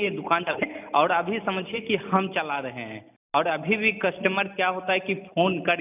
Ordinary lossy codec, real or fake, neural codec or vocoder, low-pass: none; real; none; 3.6 kHz